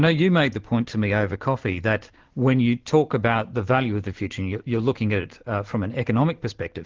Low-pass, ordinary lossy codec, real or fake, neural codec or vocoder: 7.2 kHz; Opus, 16 kbps; real; none